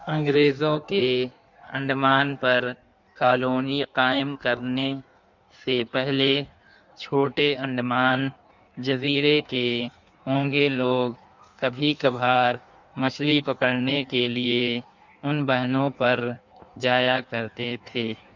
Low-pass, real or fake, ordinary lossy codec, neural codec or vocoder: 7.2 kHz; fake; none; codec, 16 kHz in and 24 kHz out, 1.1 kbps, FireRedTTS-2 codec